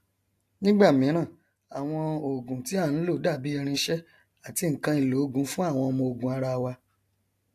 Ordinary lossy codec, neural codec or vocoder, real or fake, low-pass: AAC, 64 kbps; none; real; 14.4 kHz